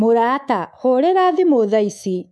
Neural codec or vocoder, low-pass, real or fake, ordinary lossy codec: codec, 24 kHz, 3.1 kbps, DualCodec; none; fake; none